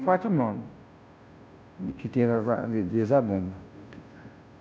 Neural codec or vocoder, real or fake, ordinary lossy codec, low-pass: codec, 16 kHz, 0.5 kbps, FunCodec, trained on Chinese and English, 25 frames a second; fake; none; none